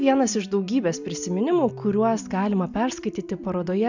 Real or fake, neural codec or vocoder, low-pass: real; none; 7.2 kHz